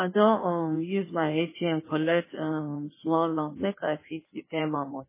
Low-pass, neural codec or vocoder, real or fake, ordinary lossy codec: 3.6 kHz; codec, 16 kHz in and 24 kHz out, 1.1 kbps, FireRedTTS-2 codec; fake; MP3, 16 kbps